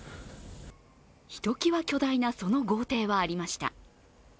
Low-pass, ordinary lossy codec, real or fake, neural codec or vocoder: none; none; real; none